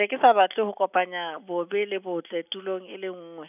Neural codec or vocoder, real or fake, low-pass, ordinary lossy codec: none; real; 3.6 kHz; none